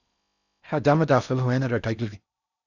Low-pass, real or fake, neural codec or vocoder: 7.2 kHz; fake; codec, 16 kHz in and 24 kHz out, 0.6 kbps, FocalCodec, streaming, 4096 codes